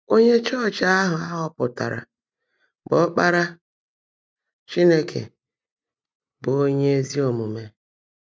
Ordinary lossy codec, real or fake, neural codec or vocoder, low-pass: none; real; none; none